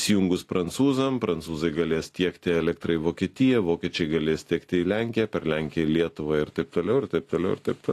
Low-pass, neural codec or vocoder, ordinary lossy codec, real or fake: 14.4 kHz; none; AAC, 48 kbps; real